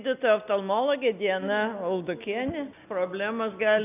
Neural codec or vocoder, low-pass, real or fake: none; 3.6 kHz; real